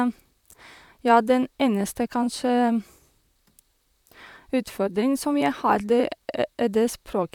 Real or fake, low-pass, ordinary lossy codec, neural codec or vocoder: fake; 19.8 kHz; none; vocoder, 44.1 kHz, 128 mel bands, Pupu-Vocoder